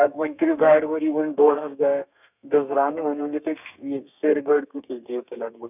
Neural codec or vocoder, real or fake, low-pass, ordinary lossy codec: codec, 32 kHz, 1.9 kbps, SNAC; fake; 3.6 kHz; none